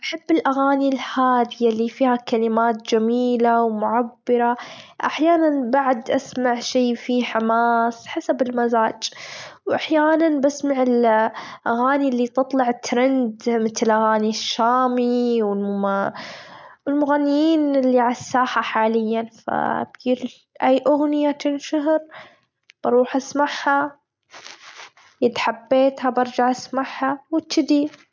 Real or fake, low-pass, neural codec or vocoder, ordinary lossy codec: real; none; none; none